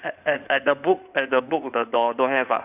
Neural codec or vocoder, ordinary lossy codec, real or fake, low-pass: codec, 16 kHz in and 24 kHz out, 2.2 kbps, FireRedTTS-2 codec; none; fake; 3.6 kHz